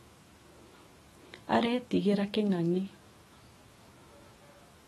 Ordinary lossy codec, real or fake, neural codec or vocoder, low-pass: AAC, 32 kbps; fake; autoencoder, 48 kHz, 128 numbers a frame, DAC-VAE, trained on Japanese speech; 19.8 kHz